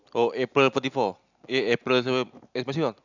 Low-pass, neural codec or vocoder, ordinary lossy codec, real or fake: 7.2 kHz; none; none; real